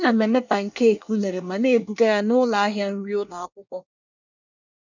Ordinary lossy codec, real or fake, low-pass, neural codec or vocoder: none; fake; 7.2 kHz; codec, 24 kHz, 1 kbps, SNAC